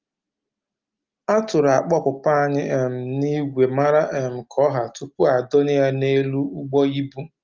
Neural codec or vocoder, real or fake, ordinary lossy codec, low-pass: none; real; Opus, 32 kbps; 7.2 kHz